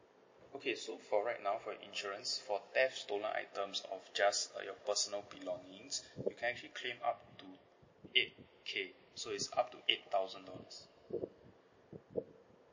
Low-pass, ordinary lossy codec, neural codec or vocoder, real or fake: 7.2 kHz; MP3, 32 kbps; none; real